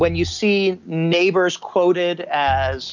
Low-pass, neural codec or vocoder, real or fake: 7.2 kHz; none; real